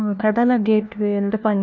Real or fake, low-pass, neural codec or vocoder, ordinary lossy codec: fake; 7.2 kHz; codec, 16 kHz, 1 kbps, FunCodec, trained on LibriTTS, 50 frames a second; none